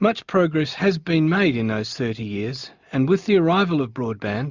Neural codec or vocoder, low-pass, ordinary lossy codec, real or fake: none; 7.2 kHz; Opus, 64 kbps; real